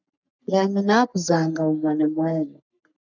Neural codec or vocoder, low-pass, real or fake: codec, 44.1 kHz, 3.4 kbps, Pupu-Codec; 7.2 kHz; fake